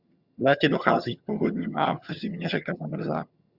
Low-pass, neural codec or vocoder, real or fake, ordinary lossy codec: 5.4 kHz; vocoder, 22.05 kHz, 80 mel bands, HiFi-GAN; fake; Opus, 64 kbps